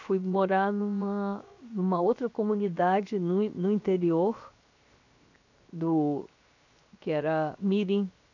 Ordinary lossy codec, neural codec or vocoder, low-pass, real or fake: AAC, 48 kbps; codec, 16 kHz, 0.7 kbps, FocalCodec; 7.2 kHz; fake